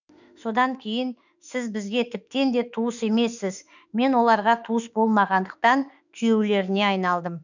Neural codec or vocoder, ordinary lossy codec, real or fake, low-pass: autoencoder, 48 kHz, 32 numbers a frame, DAC-VAE, trained on Japanese speech; none; fake; 7.2 kHz